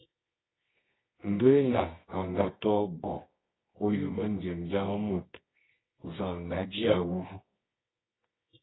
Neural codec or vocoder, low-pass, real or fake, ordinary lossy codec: codec, 24 kHz, 0.9 kbps, WavTokenizer, medium music audio release; 7.2 kHz; fake; AAC, 16 kbps